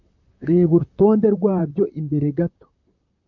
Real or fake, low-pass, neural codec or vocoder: fake; 7.2 kHz; vocoder, 44.1 kHz, 128 mel bands, Pupu-Vocoder